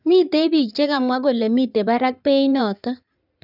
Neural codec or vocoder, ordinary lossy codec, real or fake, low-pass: codec, 16 kHz in and 24 kHz out, 2.2 kbps, FireRedTTS-2 codec; none; fake; 5.4 kHz